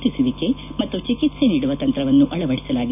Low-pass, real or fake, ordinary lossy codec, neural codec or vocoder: 3.6 kHz; real; none; none